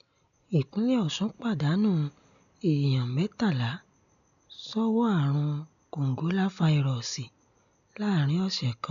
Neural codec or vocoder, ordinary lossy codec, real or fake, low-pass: none; none; real; 7.2 kHz